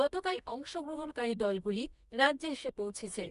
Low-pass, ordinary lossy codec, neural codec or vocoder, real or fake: 10.8 kHz; none; codec, 24 kHz, 0.9 kbps, WavTokenizer, medium music audio release; fake